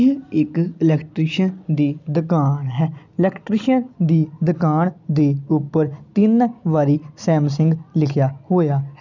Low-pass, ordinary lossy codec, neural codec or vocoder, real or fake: 7.2 kHz; none; none; real